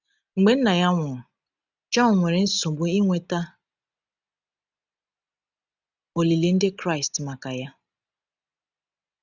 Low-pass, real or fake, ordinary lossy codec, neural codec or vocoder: 7.2 kHz; real; none; none